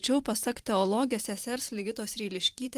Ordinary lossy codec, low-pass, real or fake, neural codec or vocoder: Opus, 24 kbps; 14.4 kHz; fake; vocoder, 44.1 kHz, 128 mel bands every 512 samples, BigVGAN v2